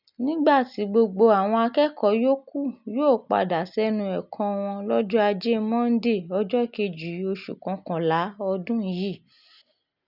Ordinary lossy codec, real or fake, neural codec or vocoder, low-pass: none; real; none; 5.4 kHz